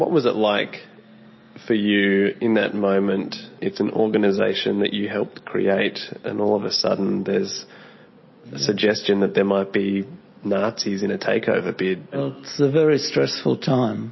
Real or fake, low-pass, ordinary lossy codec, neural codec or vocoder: real; 7.2 kHz; MP3, 24 kbps; none